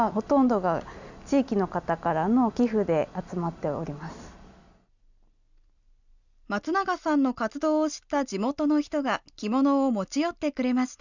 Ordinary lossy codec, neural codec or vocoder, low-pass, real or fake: none; none; 7.2 kHz; real